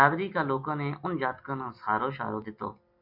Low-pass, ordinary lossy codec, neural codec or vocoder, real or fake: 5.4 kHz; MP3, 48 kbps; none; real